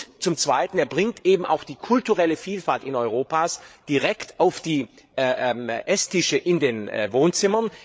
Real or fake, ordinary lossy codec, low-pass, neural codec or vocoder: fake; none; none; codec, 16 kHz, 8 kbps, FreqCodec, larger model